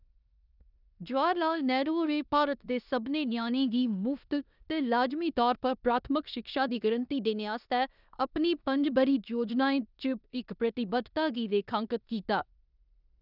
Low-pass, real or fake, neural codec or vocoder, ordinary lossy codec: 5.4 kHz; fake; codec, 16 kHz in and 24 kHz out, 0.9 kbps, LongCat-Audio-Codec, four codebook decoder; none